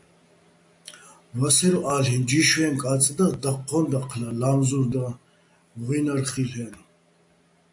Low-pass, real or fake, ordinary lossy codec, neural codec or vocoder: 10.8 kHz; real; AAC, 64 kbps; none